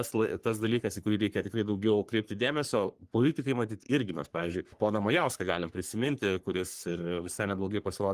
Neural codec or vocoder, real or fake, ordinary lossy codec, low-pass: codec, 44.1 kHz, 3.4 kbps, Pupu-Codec; fake; Opus, 24 kbps; 14.4 kHz